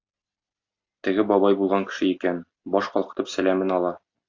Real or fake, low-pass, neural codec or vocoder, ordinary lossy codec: real; 7.2 kHz; none; AAC, 48 kbps